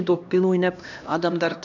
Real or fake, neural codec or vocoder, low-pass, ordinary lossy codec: fake; codec, 16 kHz, 1 kbps, X-Codec, HuBERT features, trained on LibriSpeech; 7.2 kHz; none